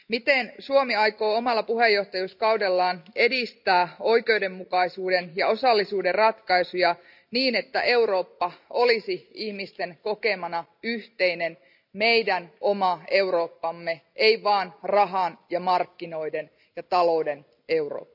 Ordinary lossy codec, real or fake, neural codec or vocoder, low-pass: none; real; none; 5.4 kHz